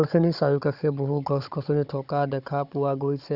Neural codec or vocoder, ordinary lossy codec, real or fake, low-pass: codec, 16 kHz, 16 kbps, FunCodec, trained on Chinese and English, 50 frames a second; none; fake; 5.4 kHz